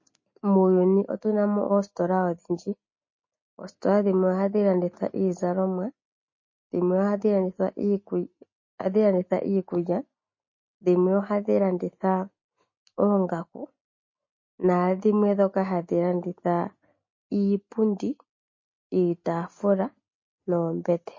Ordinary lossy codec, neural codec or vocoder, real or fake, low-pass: MP3, 32 kbps; none; real; 7.2 kHz